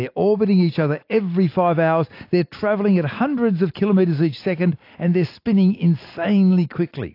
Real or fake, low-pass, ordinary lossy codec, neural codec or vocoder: real; 5.4 kHz; AAC, 32 kbps; none